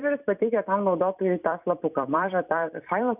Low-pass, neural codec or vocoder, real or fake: 3.6 kHz; vocoder, 44.1 kHz, 128 mel bands every 256 samples, BigVGAN v2; fake